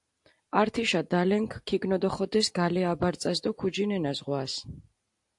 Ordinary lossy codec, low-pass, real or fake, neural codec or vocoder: AAC, 64 kbps; 10.8 kHz; real; none